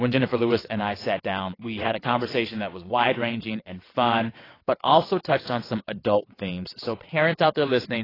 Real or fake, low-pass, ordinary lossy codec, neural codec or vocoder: fake; 5.4 kHz; AAC, 24 kbps; vocoder, 22.05 kHz, 80 mel bands, WaveNeXt